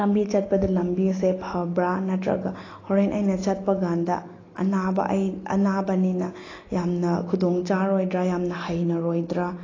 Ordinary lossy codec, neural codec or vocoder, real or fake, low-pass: AAC, 32 kbps; none; real; 7.2 kHz